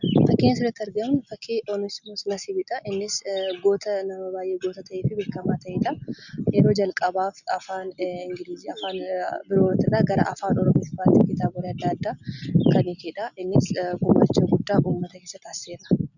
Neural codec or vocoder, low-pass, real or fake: none; 7.2 kHz; real